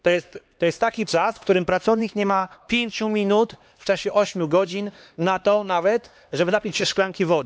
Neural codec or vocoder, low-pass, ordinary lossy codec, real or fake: codec, 16 kHz, 2 kbps, X-Codec, HuBERT features, trained on LibriSpeech; none; none; fake